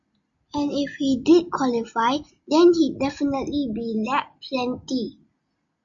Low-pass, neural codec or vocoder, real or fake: 7.2 kHz; none; real